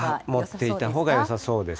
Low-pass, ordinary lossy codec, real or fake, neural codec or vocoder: none; none; real; none